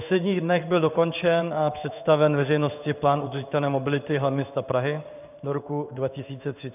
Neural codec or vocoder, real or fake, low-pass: codec, 16 kHz in and 24 kHz out, 1 kbps, XY-Tokenizer; fake; 3.6 kHz